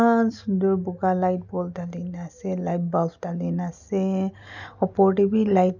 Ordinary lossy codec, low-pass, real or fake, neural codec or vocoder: none; 7.2 kHz; real; none